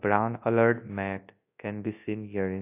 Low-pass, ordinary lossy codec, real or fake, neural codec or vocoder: 3.6 kHz; AAC, 24 kbps; fake; codec, 24 kHz, 0.9 kbps, WavTokenizer, large speech release